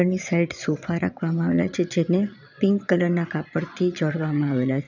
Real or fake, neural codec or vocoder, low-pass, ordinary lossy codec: fake; vocoder, 22.05 kHz, 80 mel bands, Vocos; 7.2 kHz; none